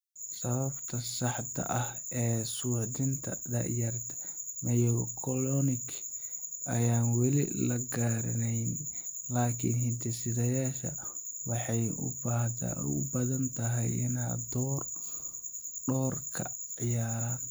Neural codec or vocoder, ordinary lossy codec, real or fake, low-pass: none; none; real; none